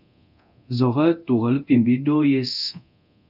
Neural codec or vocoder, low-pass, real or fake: codec, 24 kHz, 0.9 kbps, DualCodec; 5.4 kHz; fake